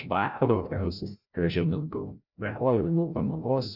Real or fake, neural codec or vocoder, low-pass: fake; codec, 16 kHz, 0.5 kbps, FreqCodec, larger model; 5.4 kHz